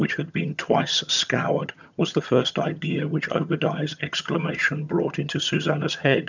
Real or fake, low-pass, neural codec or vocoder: fake; 7.2 kHz; vocoder, 22.05 kHz, 80 mel bands, HiFi-GAN